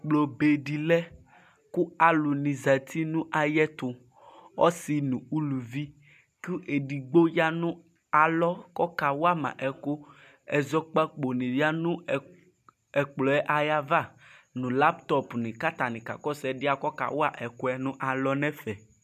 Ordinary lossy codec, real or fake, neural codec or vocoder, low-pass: MP3, 96 kbps; real; none; 14.4 kHz